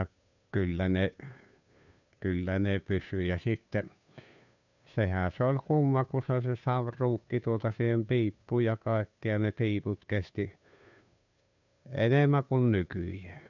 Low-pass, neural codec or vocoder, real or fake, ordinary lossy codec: 7.2 kHz; codec, 16 kHz, 2 kbps, FunCodec, trained on Chinese and English, 25 frames a second; fake; none